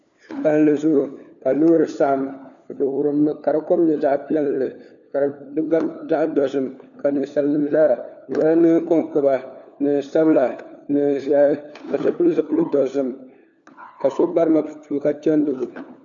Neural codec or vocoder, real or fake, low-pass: codec, 16 kHz, 4 kbps, FunCodec, trained on LibriTTS, 50 frames a second; fake; 7.2 kHz